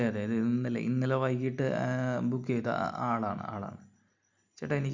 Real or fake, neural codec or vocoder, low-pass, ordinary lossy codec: real; none; 7.2 kHz; MP3, 64 kbps